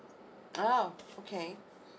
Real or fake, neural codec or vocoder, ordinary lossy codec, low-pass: real; none; none; none